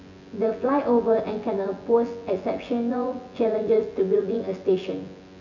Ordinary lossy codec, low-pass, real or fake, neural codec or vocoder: none; 7.2 kHz; fake; vocoder, 24 kHz, 100 mel bands, Vocos